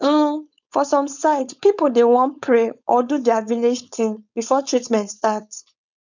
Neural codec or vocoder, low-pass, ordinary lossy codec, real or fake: codec, 16 kHz, 4.8 kbps, FACodec; 7.2 kHz; none; fake